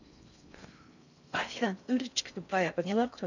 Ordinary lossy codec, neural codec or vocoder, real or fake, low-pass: none; codec, 16 kHz in and 24 kHz out, 0.6 kbps, FocalCodec, streaming, 4096 codes; fake; 7.2 kHz